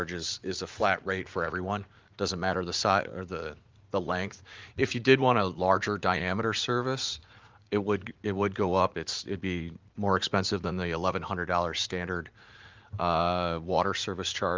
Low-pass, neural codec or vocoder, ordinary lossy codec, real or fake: 7.2 kHz; vocoder, 44.1 kHz, 80 mel bands, Vocos; Opus, 24 kbps; fake